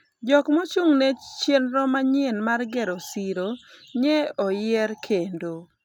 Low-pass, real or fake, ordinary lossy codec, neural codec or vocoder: 19.8 kHz; real; none; none